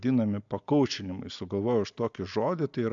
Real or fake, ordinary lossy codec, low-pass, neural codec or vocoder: real; AAC, 64 kbps; 7.2 kHz; none